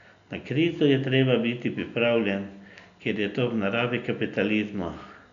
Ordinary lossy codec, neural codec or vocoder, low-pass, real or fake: none; none; 7.2 kHz; real